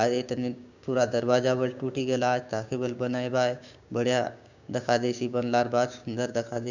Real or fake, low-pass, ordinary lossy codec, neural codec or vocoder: fake; 7.2 kHz; none; codec, 16 kHz, 6 kbps, DAC